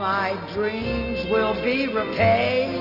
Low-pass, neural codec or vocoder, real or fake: 5.4 kHz; none; real